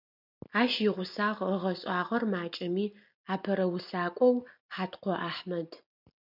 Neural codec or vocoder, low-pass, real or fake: none; 5.4 kHz; real